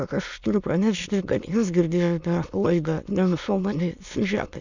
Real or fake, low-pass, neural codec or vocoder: fake; 7.2 kHz; autoencoder, 22.05 kHz, a latent of 192 numbers a frame, VITS, trained on many speakers